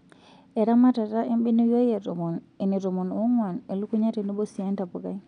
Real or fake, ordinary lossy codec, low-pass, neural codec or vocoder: real; none; 9.9 kHz; none